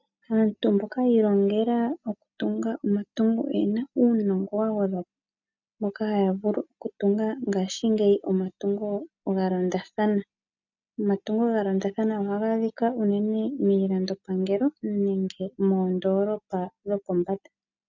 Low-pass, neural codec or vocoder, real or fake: 7.2 kHz; none; real